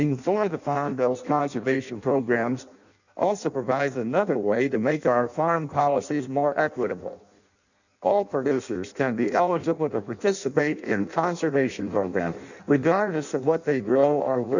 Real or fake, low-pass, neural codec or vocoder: fake; 7.2 kHz; codec, 16 kHz in and 24 kHz out, 0.6 kbps, FireRedTTS-2 codec